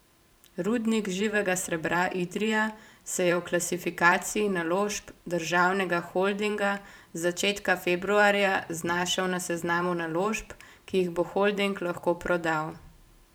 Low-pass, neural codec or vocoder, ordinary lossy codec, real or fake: none; vocoder, 44.1 kHz, 128 mel bands every 512 samples, BigVGAN v2; none; fake